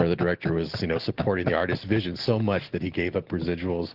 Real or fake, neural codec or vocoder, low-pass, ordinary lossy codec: real; none; 5.4 kHz; Opus, 16 kbps